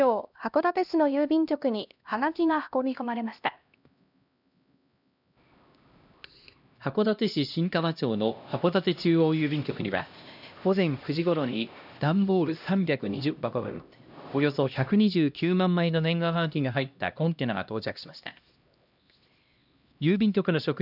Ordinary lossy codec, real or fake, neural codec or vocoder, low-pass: none; fake; codec, 16 kHz, 1 kbps, X-Codec, HuBERT features, trained on LibriSpeech; 5.4 kHz